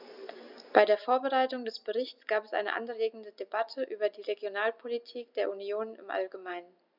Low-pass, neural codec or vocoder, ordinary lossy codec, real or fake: 5.4 kHz; none; none; real